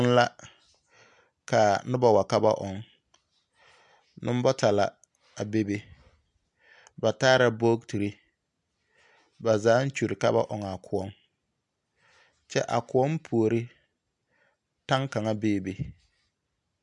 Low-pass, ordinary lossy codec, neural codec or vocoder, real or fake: 10.8 kHz; MP3, 96 kbps; none; real